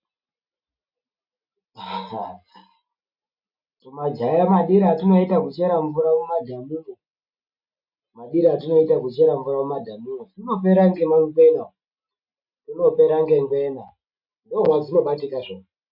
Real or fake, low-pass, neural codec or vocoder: real; 5.4 kHz; none